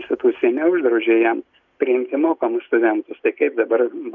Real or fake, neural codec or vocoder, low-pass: real; none; 7.2 kHz